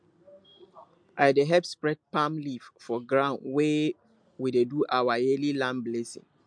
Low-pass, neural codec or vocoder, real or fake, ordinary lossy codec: 9.9 kHz; none; real; MP3, 64 kbps